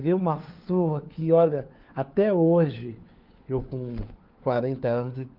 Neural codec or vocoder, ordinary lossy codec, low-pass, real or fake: codec, 16 kHz, 4 kbps, FunCodec, trained on LibriTTS, 50 frames a second; Opus, 24 kbps; 5.4 kHz; fake